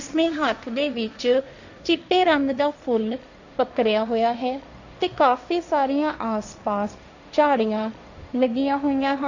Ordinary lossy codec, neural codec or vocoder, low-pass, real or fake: none; codec, 16 kHz, 1.1 kbps, Voila-Tokenizer; 7.2 kHz; fake